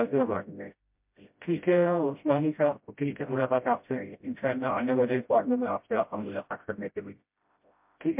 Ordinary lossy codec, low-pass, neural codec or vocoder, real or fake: MP3, 32 kbps; 3.6 kHz; codec, 16 kHz, 0.5 kbps, FreqCodec, smaller model; fake